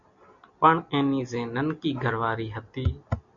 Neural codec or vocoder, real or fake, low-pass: none; real; 7.2 kHz